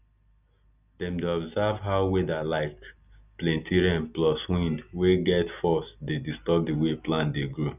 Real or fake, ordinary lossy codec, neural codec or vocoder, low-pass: real; none; none; 3.6 kHz